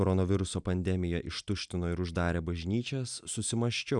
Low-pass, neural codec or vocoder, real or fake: 10.8 kHz; none; real